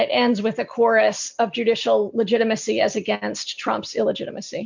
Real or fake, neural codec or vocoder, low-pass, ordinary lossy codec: real; none; 7.2 kHz; MP3, 64 kbps